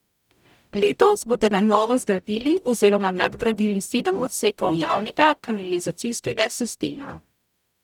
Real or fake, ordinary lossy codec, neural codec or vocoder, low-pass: fake; none; codec, 44.1 kHz, 0.9 kbps, DAC; 19.8 kHz